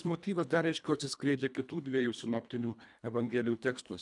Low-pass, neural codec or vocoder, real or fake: 10.8 kHz; codec, 24 kHz, 1.5 kbps, HILCodec; fake